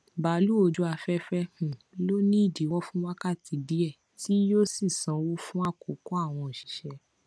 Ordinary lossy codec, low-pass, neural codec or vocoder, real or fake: none; none; none; real